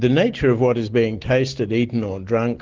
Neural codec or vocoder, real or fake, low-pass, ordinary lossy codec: none; real; 7.2 kHz; Opus, 16 kbps